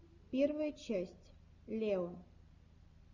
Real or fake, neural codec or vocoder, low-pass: real; none; 7.2 kHz